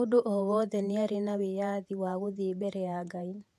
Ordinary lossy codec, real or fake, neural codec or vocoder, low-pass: none; fake; vocoder, 48 kHz, 128 mel bands, Vocos; 14.4 kHz